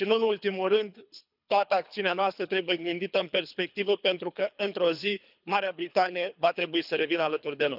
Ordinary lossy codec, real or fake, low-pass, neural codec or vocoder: none; fake; 5.4 kHz; codec, 24 kHz, 3 kbps, HILCodec